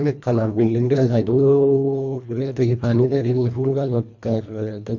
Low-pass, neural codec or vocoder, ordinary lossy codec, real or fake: 7.2 kHz; codec, 24 kHz, 1.5 kbps, HILCodec; none; fake